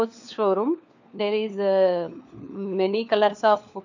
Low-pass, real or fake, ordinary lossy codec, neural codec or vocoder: 7.2 kHz; fake; none; codec, 16 kHz, 4 kbps, FunCodec, trained on LibriTTS, 50 frames a second